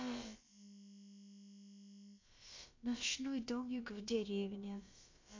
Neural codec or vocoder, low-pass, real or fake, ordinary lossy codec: codec, 16 kHz, about 1 kbps, DyCAST, with the encoder's durations; 7.2 kHz; fake; MP3, 48 kbps